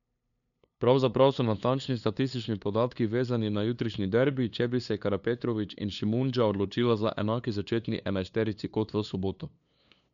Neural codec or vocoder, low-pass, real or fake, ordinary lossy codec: codec, 16 kHz, 2 kbps, FunCodec, trained on LibriTTS, 25 frames a second; 7.2 kHz; fake; none